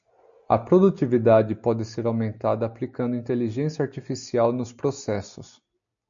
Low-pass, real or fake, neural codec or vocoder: 7.2 kHz; real; none